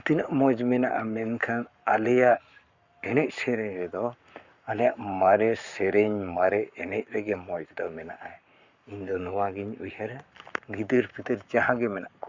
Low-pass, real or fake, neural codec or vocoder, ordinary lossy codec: 7.2 kHz; fake; codec, 44.1 kHz, 7.8 kbps, Pupu-Codec; Opus, 64 kbps